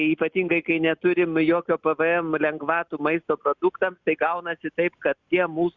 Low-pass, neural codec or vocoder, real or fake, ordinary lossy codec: 7.2 kHz; none; real; Opus, 64 kbps